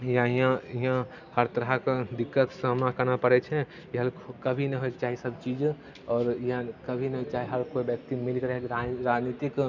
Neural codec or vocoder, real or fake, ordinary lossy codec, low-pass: none; real; none; 7.2 kHz